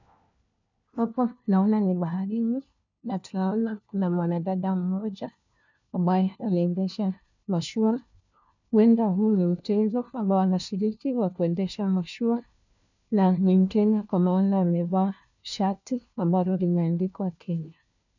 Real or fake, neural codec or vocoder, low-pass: fake; codec, 16 kHz, 1 kbps, FunCodec, trained on LibriTTS, 50 frames a second; 7.2 kHz